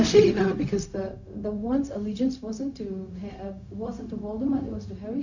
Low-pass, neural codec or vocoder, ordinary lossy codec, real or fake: 7.2 kHz; codec, 16 kHz, 0.4 kbps, LongCat-Audio-Codec; none; fake